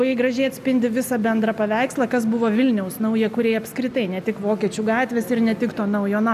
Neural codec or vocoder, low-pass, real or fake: none; 14.4 kHz; real